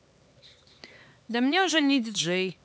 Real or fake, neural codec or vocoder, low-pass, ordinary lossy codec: fake; codec, 16 kHz, 4 kbps, X-Codec, HuBERT features, trained on LibriSpeech; none; none